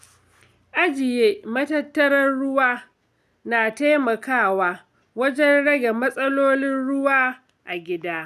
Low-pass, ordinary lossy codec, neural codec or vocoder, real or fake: 14.4 kHz; none; none; real